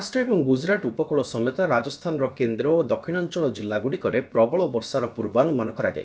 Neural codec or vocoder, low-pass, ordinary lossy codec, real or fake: codec, 16 kHz, about 1 kbps, DyCAST, with the encoder's durations; none; none; fake